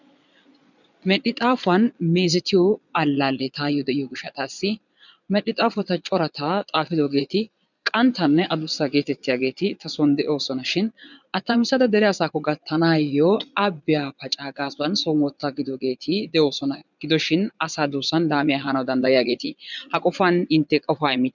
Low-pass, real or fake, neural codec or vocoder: 7.2 kHz; fake; vocoder, 22.05 kHz, 80 mel bands, Vocos